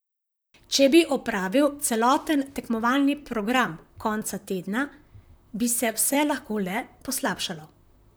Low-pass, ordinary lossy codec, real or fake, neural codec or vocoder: none; none; fake; vocoder, 44.1 kHz, 128 mel bands, Pupu-Vocoder